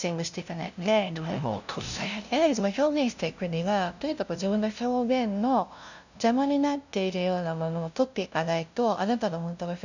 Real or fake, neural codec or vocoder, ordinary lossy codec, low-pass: fake; codec, 16 kHz, 0.5 kbps, FunCodec, trained on LibriTTS, 25 frames a second; none; 7.2 kHz